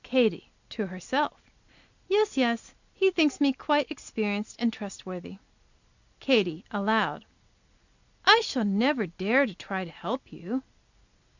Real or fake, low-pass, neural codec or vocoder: real; 7.2 kHz; none